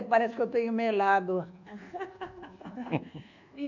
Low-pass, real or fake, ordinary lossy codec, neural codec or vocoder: 7.2 kHz; fake; none; codec, 24 kHz, 1.2 kbps, DualCodec